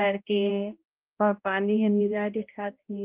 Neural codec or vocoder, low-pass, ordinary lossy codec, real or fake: codec, 16 kHz, 0.5 kbps, X-Codec, HuBERT features, trained on balanced general audio; 3.6 kHz; Opus, 32 kbps; fake